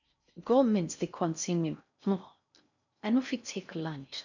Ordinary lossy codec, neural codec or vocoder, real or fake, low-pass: none; codec, 16 kHz in and 24 kHz out, 0.6 kbps, FocalCodec, streaming, 4096 codes; fake; 7.2 kHz